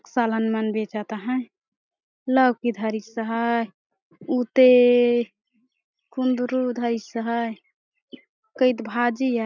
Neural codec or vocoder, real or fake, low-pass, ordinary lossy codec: none; real; 7.2 kHz; none